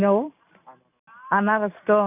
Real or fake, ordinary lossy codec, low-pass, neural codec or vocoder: real; none; 3.6 kHz; none